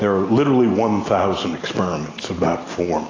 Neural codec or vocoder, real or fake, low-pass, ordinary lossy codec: none; real; 7.2 kHz; AAC, 32 kbps